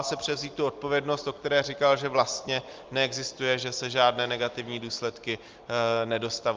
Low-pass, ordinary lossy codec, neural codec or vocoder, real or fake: 7.2 kHz; Opus, 24 kbps; none; real